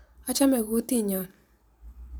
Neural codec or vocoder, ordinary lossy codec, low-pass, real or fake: vocoder, 44.1 kHz, 128 mel bands every 512 samples, BigVGAN v2; none; none; fake